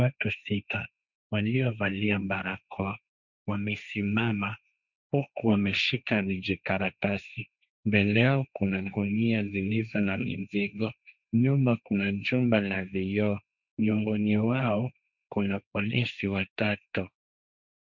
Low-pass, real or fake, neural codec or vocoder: 7.2 kHz; fake; codec, 16 kHz, 1.1 kbps, Voila-Tokenizer